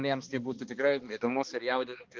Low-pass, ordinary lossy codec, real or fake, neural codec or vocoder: 7.2 kHz; Opus, 16 kbps; fake; codec, 16 kHz, 2 kbps, X-Codec, HuBERT features, trained on balanced general audio